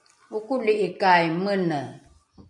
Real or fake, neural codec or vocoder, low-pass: real; none; 10.8 kHz